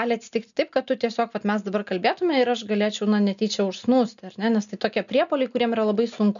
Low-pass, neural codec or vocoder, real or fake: 7.2 kHz; none; real